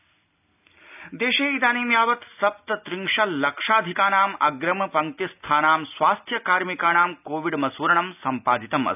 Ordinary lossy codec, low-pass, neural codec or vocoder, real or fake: none; 3.6 kHz; none; real